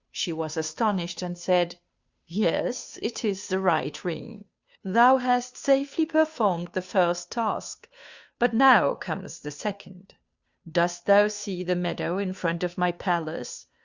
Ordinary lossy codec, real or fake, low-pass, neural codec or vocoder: Opus, 64 kbps; fake; 7.2 kHz; codec, 16 kHz, 2 kbps, FunCodec, trained on Chinese and English, 25 frames a second